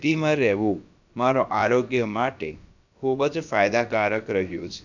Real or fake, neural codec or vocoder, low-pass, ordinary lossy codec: fake; codec, 16 kHz, about 1 kbps, DyCAST, with the encoder's durations; 7.2 kHz; none